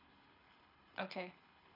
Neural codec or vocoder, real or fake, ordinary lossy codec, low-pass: codec, 24 kHz, 6 kbps, HILCodec; fake; none; 5.4 kHz